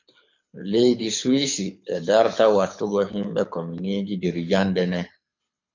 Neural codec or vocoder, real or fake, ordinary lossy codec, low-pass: codec, 24 kHz, 6 kbps, HILCodec; fake; MP3, 64 kbps; 7.2 kHz